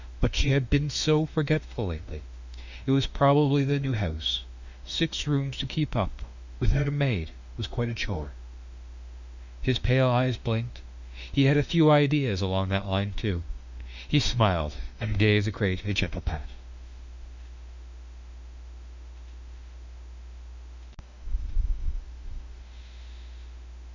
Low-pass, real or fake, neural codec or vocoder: 7.2 kHz; fake; autoencoder, 48 kHz, 32 numbers a frame, DAC-VAE, trained on Japanese speech